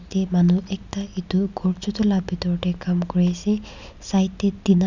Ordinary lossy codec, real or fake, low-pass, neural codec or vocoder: none; real; 7.2 kHz; none